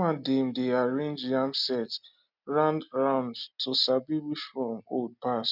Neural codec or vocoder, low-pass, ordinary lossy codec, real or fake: none; 5.4 kHz; none; real